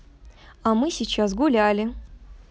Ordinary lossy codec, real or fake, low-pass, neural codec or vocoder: none; real; none; none